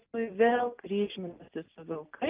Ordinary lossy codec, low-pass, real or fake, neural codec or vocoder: Opus, 32 kbps; 3.6 kHz; real; none